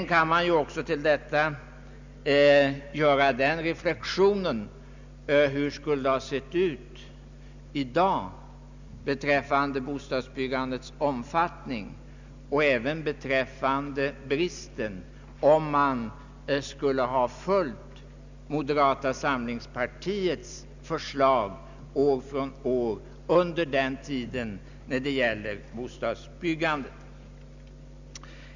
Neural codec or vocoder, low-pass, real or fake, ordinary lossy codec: none; 7.2 kHz; real; Opus, 64 kbps